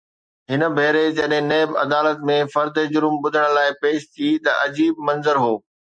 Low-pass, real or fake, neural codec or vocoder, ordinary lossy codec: 9.9 kHz; real; none; MP3, 64 kbps